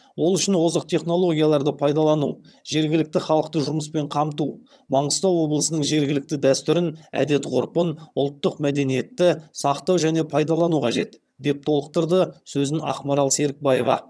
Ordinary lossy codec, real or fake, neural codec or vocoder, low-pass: none; fake; vocoder, 22.05 kHz, 80 mel bands, HiFi-GAN; none